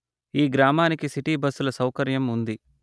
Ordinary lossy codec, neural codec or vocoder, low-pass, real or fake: none; none; none; real